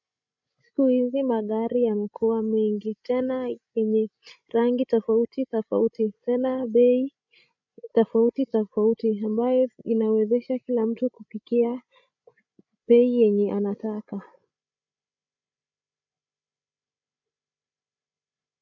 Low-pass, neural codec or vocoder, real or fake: 7.2 kHz; codec, 16 kHz, 16 kbps, FreqCodec, larger model; fake